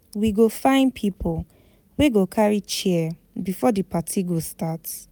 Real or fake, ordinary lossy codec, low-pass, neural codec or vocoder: real; none; none; none